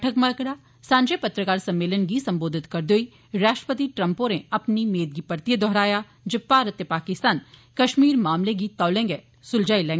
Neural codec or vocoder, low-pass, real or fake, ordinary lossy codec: none; none; real; none